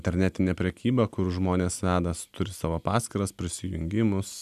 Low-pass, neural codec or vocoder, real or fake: 14.4 kHz; none; real